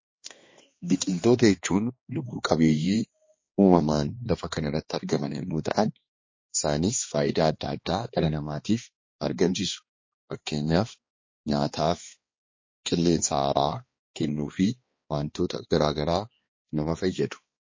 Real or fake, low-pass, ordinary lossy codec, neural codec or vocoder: fake; 7.2 kHz; MP3, 32 kbps; codec, 16 kHz, 2 kbps, X-Codec, HuBERT features, trained on balanced general audio